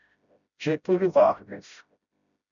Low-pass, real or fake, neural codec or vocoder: 7.2 kHz; fake; codec, 16 kHz, 0.5 kbps, FreqCodec, smaller model